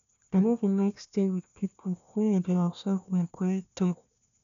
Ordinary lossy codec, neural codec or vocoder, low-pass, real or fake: none; codec, 16 kHz, 1 kbps, FunCodec, trained on Chinese and English, 50 frames a second; 7.2 kHz; fake